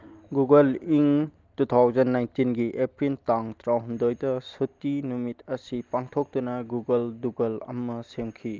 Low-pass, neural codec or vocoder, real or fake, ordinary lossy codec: 7.2 kHz; none; real; Opus, 32 kbps